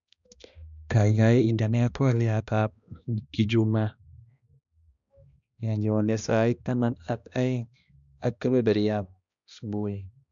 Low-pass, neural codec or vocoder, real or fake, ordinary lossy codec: 7.2 kHz; codec, 16 kHz, 1 kbps, X-Codec, HuBERT features, trained on balanced general audio; fake; none